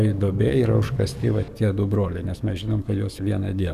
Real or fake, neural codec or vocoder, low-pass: fake; codec, 44.1 kHz, 7.8 kbps, DAC; 14.4 kHz